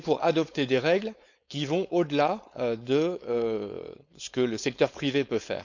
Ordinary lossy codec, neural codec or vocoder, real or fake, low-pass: none; codec, 16 kHz, 4.8 kbps, FACodec; fake; 7.2 kHz